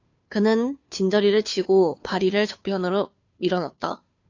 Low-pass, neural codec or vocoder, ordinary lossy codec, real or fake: 7.2 kHz; codec, 16 kHz, 2 kbps, FunCodec, trained on Chinese and English, 25 frames a second; AAC, 48 kbps; fake